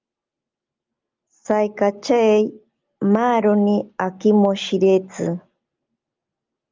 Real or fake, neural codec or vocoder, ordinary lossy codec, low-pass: real; none; Opus, 32 kbps; 7.2 kHz